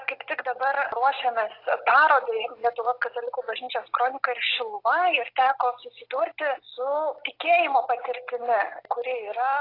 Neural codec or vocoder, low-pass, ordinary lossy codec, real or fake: none; 5.4 kHz; AAC, 32 kbps; real